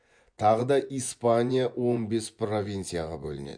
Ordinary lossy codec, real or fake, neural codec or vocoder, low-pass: MP3, 64 kbps; fake; vocoder, 24 kHz, 100 mel bands, Vocos; 9.9 kHz